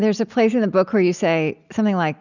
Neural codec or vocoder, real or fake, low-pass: none; real; 7.2 kHz